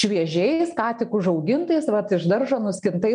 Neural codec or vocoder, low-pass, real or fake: none; 9.9 kHz; real